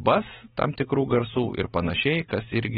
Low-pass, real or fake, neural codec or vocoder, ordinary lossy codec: 7.2 kHz; real; none; AAC, 16 kbps